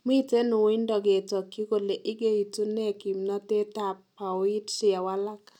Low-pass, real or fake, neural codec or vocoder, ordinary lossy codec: 19.8 kHz; real; none; none